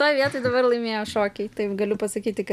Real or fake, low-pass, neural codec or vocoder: real; 14.4 kHz; none